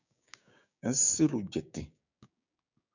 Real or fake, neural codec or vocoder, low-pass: fake; codec, 16 kHz, 6 kbps, DAC; 7.2 kHz